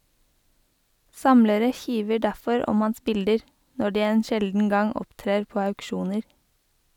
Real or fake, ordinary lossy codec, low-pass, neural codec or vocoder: real; none; 19.8 kHz; none